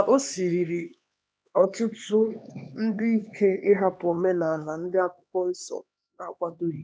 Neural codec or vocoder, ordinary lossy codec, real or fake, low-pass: codec, 16 kHz, 2 kbps, X-Codec, HuBERT features, trained on LibriSpeech; none; fake; none